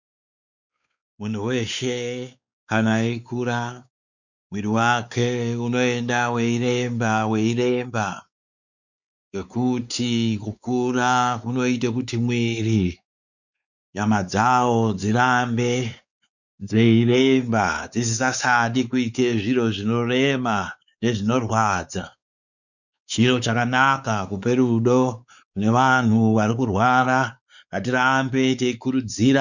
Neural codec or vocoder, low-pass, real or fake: codec, 16 kHz, 4 kbps, X-Codec, WavLM features, trained on Multilingual LibriSpeech; 7.2 kHz; fake